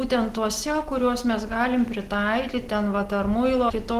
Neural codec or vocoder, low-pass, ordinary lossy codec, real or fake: none; 14.4 kHz; Opus, 16 kbps; real